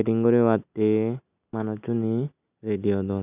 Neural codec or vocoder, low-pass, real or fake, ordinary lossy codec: none; 3.6 kHz; real; none